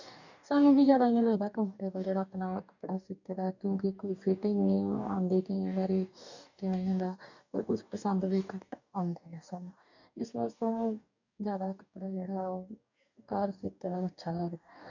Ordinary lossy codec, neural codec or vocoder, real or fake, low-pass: none; codec, 44.1 kHz, 2.6 kbps, DAC; fake; 7.2 kHz